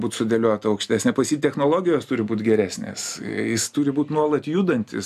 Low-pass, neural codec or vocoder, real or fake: 14.4 kHz; none; real